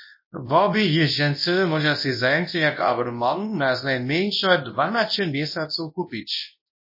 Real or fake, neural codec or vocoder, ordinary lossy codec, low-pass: fake; codec, 24 kHz, 0.9 kbps, WavTokenizer, large speech release; MP3, 24 kbps; 5.4 kHz